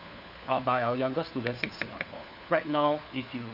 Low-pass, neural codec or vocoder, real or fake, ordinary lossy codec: 5.4 kHz; codec, 16 kHz, 4 kbps, FunCodec, trained on LibriTTS, 50 frames a second; fake; none